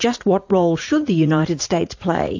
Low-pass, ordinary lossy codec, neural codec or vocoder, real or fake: 7.2 kHz; AAC, 48 kbps; vocoder, 44.1 kHz, 80 mel bands, Vocos; fake